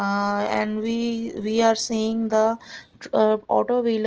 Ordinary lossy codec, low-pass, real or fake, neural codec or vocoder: Opus, 16 kbps; 7.2 kHz; real; none